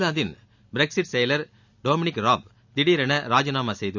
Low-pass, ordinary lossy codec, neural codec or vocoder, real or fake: 7.2 kHz; none; none; real